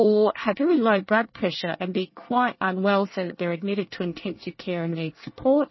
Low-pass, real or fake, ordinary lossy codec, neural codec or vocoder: 7.2 kHz; fake; MP3, 24 kbps; codec, 24 kHz, 1 kbps, SNAC